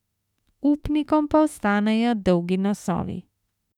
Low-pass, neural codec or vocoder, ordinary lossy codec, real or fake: 19.8 kHz; autoencoder, 48 kHz, 32 numbers a frame, DAC-VAE, trained on Japanese speech; none; fake